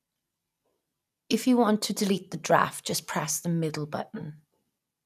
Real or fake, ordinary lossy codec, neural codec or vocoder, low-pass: real; none; none; 14.4 kHz